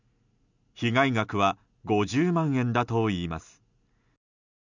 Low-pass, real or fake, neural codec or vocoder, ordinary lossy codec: 7.2 kHz; real; none; none